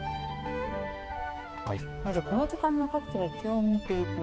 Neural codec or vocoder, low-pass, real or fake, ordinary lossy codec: codec, 16 kHz, 2 kbps, X-Codec, HuBERT features, trained on balanced general audio; none; fake; none